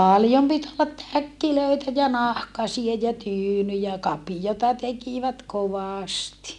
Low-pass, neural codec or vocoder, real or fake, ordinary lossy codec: none; none; real; none